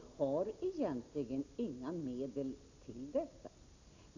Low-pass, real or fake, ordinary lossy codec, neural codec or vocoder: 7.2 kHz; real; none; none